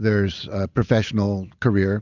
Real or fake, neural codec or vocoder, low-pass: real; none; 7.2 kHz